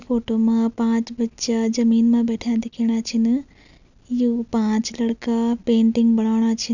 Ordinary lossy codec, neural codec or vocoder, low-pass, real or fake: none; none; 7.2 kHz; real